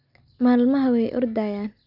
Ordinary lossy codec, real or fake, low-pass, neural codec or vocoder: none; real; 5.4 kHz; none